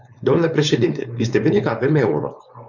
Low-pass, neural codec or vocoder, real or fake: 7.2 kHz; codec, 16 kHz, 4.8 kbps, FACodec; fake